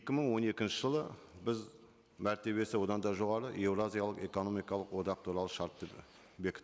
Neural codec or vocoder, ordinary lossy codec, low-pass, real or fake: none; none; none; real